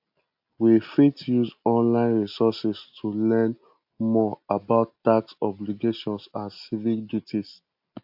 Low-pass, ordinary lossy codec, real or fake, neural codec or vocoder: 5.4 kHz; AAC, 48 kbps; real; none